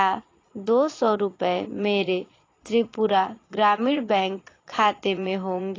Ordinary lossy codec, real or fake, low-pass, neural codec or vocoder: AAC, 32 kbps; fake; 7.2 kHz; vocoder, 22.05 kHz, 80 mel bands, Vocos